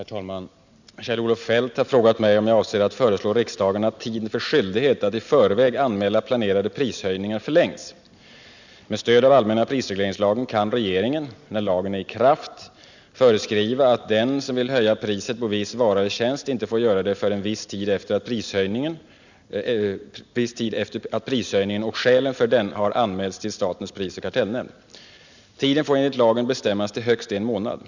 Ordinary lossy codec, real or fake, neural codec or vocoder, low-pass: none; real; none; 7.2 kHz